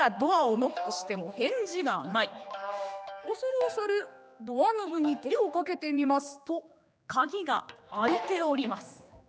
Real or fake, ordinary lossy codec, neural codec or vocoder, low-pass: fake; none; codec, 16 kHz, 2 kbps, X-Codec, HuBERT features, trained on general audio; none